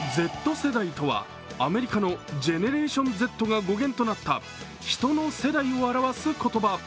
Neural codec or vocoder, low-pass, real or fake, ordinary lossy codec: none; none; real; none